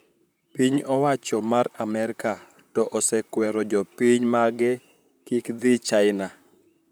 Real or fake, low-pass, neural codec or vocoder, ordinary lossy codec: fake; none; vocoder, 44.1 kHz, 128 mel bands, Pupu-Vocoder; none